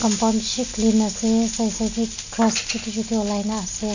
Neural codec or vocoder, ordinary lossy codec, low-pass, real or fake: none; none; 7.2 kHz; real